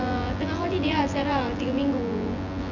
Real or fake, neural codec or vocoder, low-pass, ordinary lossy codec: fake; vocoder, 24 kHz, 100 mel bands, Vocos; 7.2 kHz; none